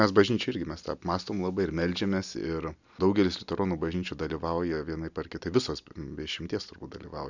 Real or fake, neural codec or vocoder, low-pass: real; none; 7.2 kHz